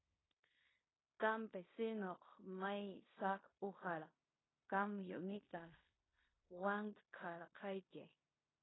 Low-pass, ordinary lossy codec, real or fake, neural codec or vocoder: 7.2 kHz; AAC, 16 kbps; fake; codec, 16 kHz in and 24 kHz out, 0.9 kbps, LongCat-Audio-Codec, fine tuned four codebook decoder